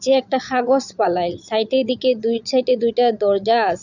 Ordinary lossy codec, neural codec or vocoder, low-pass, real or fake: none; vocoder, 22.05 kHz, 80 mel bands, Vocos; 7.2 kHz; fake